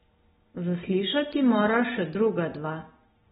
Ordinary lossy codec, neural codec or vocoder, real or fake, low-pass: AAC, 16 kbps; none; real; 10.8 kHz